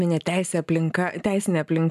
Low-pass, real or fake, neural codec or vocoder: 14.4 kHz; real; none